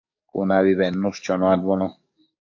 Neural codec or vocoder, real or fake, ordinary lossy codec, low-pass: codec, 44.1 kHz, 7.8 kbps, DAC; fake; AAC, 48 kbps; 7.2 kHz